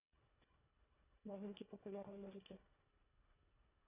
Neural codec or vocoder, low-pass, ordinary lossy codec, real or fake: codec, 24 kHz, 1.5 kbps, HILCodec; 3.6 kHz; AAC, 32 kbps; fake